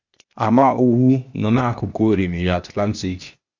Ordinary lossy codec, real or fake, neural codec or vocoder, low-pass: Opus, 64 kbps; fake; codec, 16 kHz, 0.8 kbps, ZipCodec; 7.2 kHz